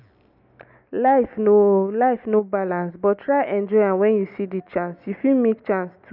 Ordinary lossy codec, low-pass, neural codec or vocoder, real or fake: none; 5.4 kHz; none; real